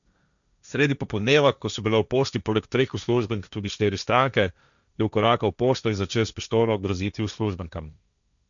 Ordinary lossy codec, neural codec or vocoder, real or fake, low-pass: none; codec, 16 kHz, 1.1 kbps, Voila-Tokenizer; fake; 7.2 kHz